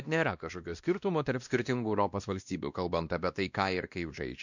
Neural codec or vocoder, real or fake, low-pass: codec, 16 kHz, 1 kbps, X-Codec, WavLM features, trained on Multilingual LibriSpeech; fake; 7.2 kHz